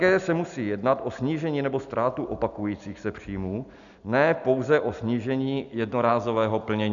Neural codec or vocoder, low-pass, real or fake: none; 7.2 kHz; real